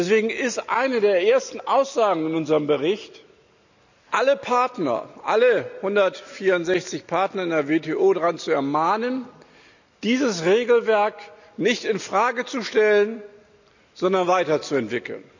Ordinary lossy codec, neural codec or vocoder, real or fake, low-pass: none; none; real; 7.2 kHz